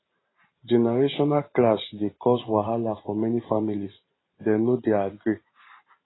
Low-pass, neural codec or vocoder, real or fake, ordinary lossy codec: 7.2 kHz; vocoder, 44.1 kHz, 128 mel bands every 512 samples, BigVGAN v2; fake; AAC, 16 kbps